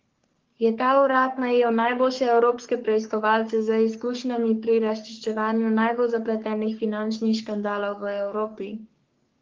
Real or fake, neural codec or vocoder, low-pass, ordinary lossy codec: fake; codec, 44.1 kHz, 3.4 kbps, Pupu-Codec; 7.2 kHz; Opus, 16 kbps